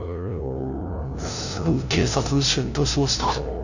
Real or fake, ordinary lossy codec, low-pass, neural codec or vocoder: fake; none; 7.2 kHz; codec, 16 kHz, 0.5 kbps, FunCodec, trained on LibriTTS, 25 frames a second